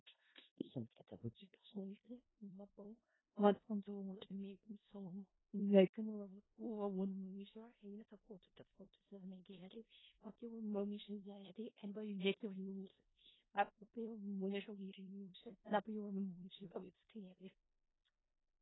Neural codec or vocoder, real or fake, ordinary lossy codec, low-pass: codec, 16 kHz in and 24 kHz out, 0.4 kbps, LongCat-Audio-Codec, four codebook decoder; fake; AAC, 16 kbps; 7.2 kHz